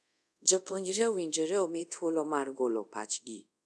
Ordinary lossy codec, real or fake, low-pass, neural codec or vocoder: none; fake; none; codec, 24 kHz, 0.5 kbps, DualCodec